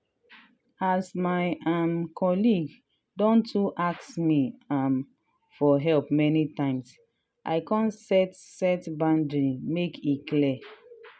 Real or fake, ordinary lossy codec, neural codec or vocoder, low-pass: real; none; none; none